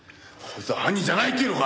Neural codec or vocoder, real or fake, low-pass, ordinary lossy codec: none; real; none; none